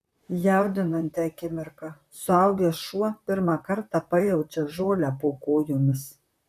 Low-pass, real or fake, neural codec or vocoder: 14.4 kHz; fake; vocoder, 44.1 kHz, 128 mel bands, Pupu-Vocoder